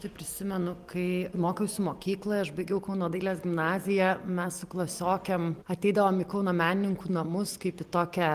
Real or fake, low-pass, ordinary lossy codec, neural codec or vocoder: real; 14.4 kHz; Opus, 24 kbps; none